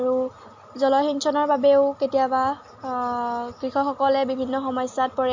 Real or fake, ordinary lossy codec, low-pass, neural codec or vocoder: real; MP3, 48 kbps; 7.2 kHz; none